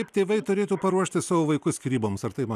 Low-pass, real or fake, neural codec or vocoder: 14.4 kHz; real; none